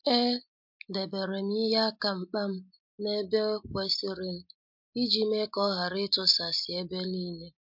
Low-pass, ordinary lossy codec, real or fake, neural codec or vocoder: 5.4 kHz; MP3, 48 kbps; real; none